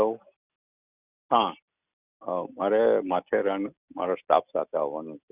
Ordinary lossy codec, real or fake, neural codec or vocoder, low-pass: none; real; none; 3.6 kHz